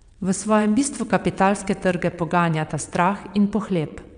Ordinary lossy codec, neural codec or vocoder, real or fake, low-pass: none; vocoder, 22.05 kHz, 80 mel bands, WaveNeXt; fake; 9.9 kHz